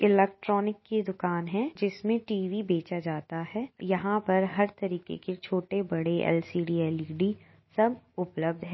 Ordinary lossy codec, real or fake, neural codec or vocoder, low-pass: MP3, 24 kbps; real; none; 7.2 kHz